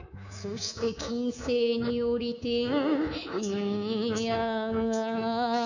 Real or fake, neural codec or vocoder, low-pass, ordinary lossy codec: fake; codec, 24 kHz, 3.1 kbps, DualCodec; 7.2 kHz; none